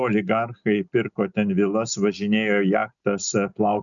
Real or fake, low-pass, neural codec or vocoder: real; 7.2 kHz; none